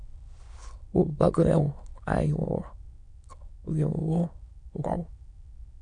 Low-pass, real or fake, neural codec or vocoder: 9.9 kHz; fake; autoencoder, 22.05 kHz, a latent of 192 numbers a frame, VITS, trained on many speakers